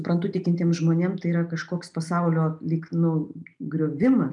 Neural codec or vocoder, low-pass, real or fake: none; 10.8 kHz; real